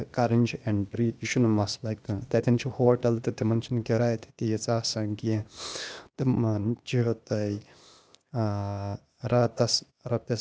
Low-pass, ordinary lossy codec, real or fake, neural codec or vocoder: none; none; fake; codec, 16 kHz, 0.8 kbps, ZipCodec